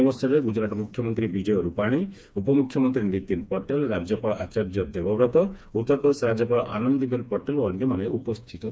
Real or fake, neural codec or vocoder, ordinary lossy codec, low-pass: fake; codec, 16 kHz, 2 kbps, FreqCodec, smaller model; none; none